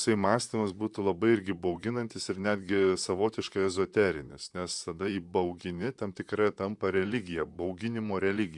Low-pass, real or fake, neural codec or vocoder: 10.8 kHz; fake; vocoder, 44.1 kHz, 128 mel bands, Pupu-Vocoder